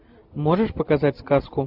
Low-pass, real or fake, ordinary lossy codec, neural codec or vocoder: 5.4 kHz; real; AAC, 48 kbps; none